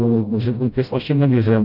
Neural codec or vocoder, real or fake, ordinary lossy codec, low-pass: codec, 16 kHz, 0.5 kbps, FreqCodec, smaller model; fake; none; 5.4 kHz